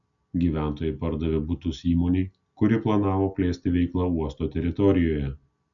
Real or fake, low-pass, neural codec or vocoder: real; 7.2 kHz; none